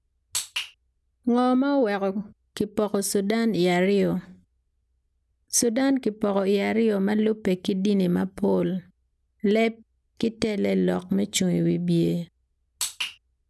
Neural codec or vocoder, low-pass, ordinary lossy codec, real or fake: none; none; none; real